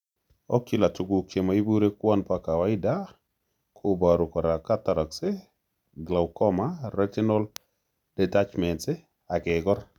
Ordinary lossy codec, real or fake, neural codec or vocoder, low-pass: none; real; none; 19.8 kHz